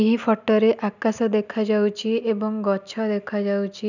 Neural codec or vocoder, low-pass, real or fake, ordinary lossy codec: none; 7.2 kHz; real; none